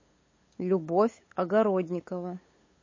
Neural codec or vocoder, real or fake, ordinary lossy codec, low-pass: codec, 16 kHz, 8 kbps, FunCodec, trained on LibriTTS, 25 frames a second; fake; MP3, 32 kbps; 7.2 kHz